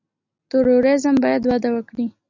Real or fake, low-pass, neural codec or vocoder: real; 7.2 kHz; none